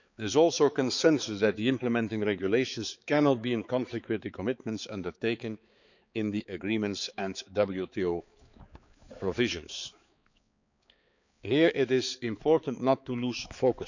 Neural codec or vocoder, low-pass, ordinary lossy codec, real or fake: codec, 16 kHz, 4 kbps, X-Codec, HuBERT features, trained on balanced general audio; 7.2 kHz; none; fake